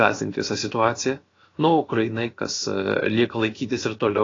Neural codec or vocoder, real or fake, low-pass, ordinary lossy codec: codec, 16 kHz, about 1 kbps, DyCAST, with the encoder's durations; fake; 7.2 kHz; AAC, 32 kbps